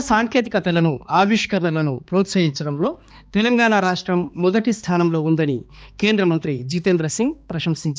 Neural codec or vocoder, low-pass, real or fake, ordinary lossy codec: codec, 16 kHz, 2 kbps, X-Codec, HuBERT features, trained on balanced general audio; none; fake; none